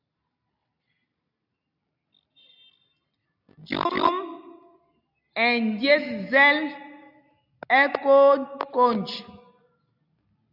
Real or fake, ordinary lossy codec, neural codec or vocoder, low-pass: real; AAC, 48 kbps; none; 5.4 kHz